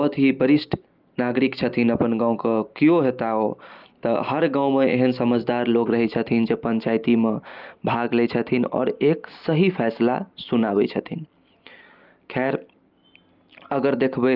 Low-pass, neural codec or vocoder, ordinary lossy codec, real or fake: 5.4 kHz; none; Opus, 24 kbps; real